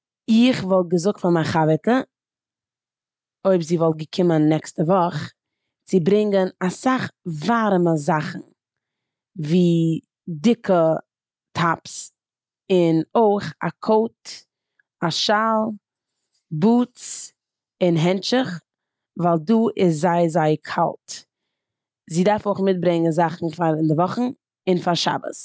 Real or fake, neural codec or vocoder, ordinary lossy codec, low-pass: real; none; none; none